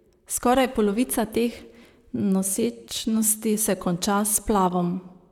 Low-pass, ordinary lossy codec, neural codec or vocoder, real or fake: 19.8 kHz; none; vocoder, 44.1 kHz, 128 mel bands, Pupu-Vocoder; fake